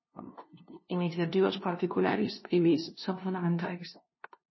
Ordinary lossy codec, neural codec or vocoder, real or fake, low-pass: MP3, 24 kbps; codec, 16 kHz, 0.5 kbps, FunCodec, trained on LibriTTS, 25 frames a second; fake; 7.2 kHz